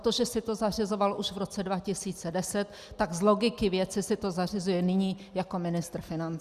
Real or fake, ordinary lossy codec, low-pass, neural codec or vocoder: real; Opus, 64 kbps; 14.4 kHz; none